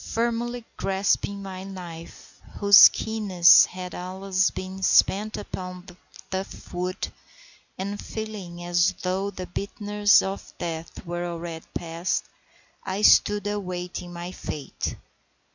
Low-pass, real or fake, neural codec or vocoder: 7.2 kHz; real; none